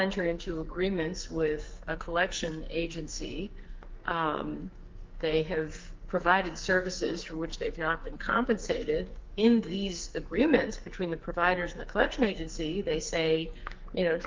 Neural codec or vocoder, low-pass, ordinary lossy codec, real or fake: codec, 44.1 kHz, 2.6 kbps, SNAC; 7.2 kHz; Opus, 24 kbps; fake